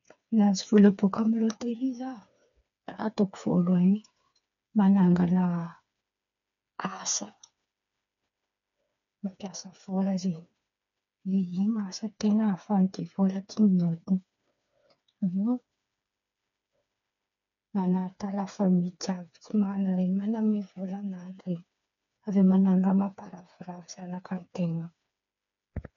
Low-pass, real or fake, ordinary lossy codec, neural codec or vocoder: 7.2 kHz; fake; MP3, 96 kbps; codec, 16 kHz, 4 kbps, FreqCodec, smaller model